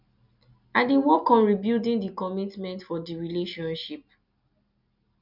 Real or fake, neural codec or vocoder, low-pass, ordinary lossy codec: real; none; 5.4 kHz; none